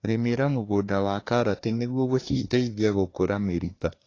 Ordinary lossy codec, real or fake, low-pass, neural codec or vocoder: AAC, 32 kbps; fake; 7.2 kHz; codec, 24 kHz, 1 kbps, SNAC